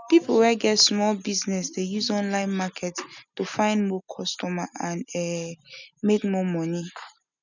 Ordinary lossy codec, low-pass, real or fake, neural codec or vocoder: none; 7.2 kHz; real; none